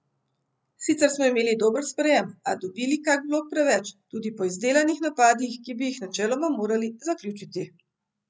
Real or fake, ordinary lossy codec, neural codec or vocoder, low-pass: real; none; none; none